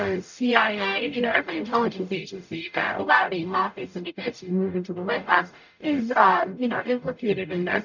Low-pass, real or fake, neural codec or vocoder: 7.2 kHz; fake; codec, 44.1 kHz, 0.9 kbps, DAC